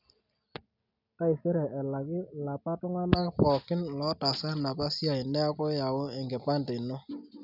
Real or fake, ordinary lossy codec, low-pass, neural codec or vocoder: real; none; 5.4 kHz; none